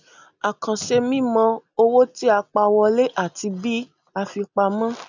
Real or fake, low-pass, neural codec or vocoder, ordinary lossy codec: real; 7.2 kHz; none; none